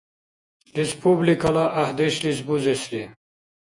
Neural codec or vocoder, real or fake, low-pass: vocoder, 48 kHz, 128 mel bands, Vocos; fake; 10.8 kHz